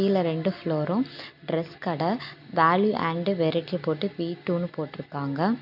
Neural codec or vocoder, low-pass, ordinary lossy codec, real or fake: none; 5.4 kHz; none; real